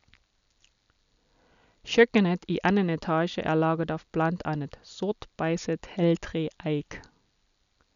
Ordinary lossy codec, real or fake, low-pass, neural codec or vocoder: none; real; 7.2 kHz; none